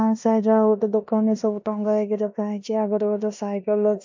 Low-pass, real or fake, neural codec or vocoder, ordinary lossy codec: 7.2 kHz; fake; codec, 16 kHz in and 24 kHz out, 0.9 kbps, LongCat-Audio-Codec, four codebook decoder; MP3, 48 kbps